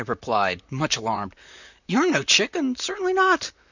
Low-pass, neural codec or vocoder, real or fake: 7.2 kHz; vocoder, 44.1 kHz, 128 mel bands, Pupu-Vocoder; fake